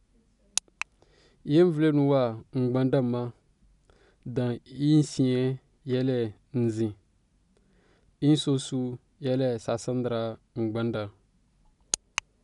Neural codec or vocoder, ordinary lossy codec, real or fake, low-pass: none; none; real; 10.8 kHz